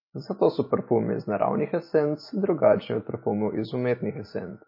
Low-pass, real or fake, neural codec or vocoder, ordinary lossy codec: 5.4 kHz; real; none; MP3, 24 kbps